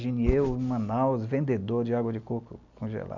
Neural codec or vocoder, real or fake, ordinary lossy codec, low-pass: none; real; none; 7.2 kHz